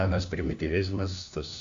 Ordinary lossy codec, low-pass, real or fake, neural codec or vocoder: AAC, 64 kbps; 7.2 kHz; fake; codec, 16 kHz, 1 kbps, FunCodec, trained on LibriTTS, 50 frames a second